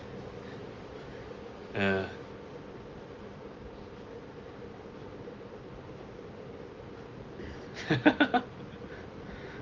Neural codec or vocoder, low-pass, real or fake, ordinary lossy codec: none; 7.2 kHz; real; Opus, 32 kbps